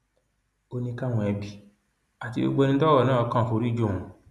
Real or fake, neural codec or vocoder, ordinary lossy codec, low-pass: real; none; none; none